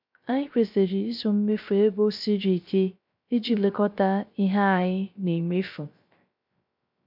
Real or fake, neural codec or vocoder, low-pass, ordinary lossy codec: fake; codec, 16 kHz, 0.3 kbps, FocalCodec; 5.4 kHz; MP3, 48 kbps